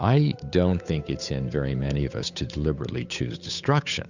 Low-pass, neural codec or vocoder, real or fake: 7.2 kHz; codec, 16 kHz, 8 kbps, FunCodec, trained on Chinese and English, 25 frames a second; fake